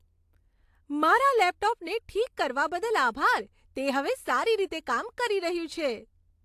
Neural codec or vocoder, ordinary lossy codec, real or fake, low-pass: none; AAC, 64 kbps; real; 14.4 kHz